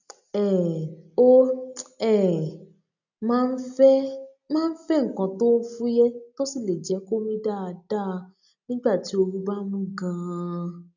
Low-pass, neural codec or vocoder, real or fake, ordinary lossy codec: 7.2 kHz; none; real; none